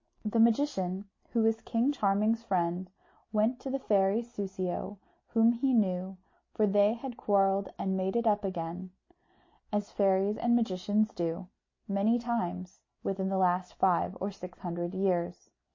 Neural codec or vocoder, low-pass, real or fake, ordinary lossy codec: none; 7.2 kHz; real; MP3, 32 kbps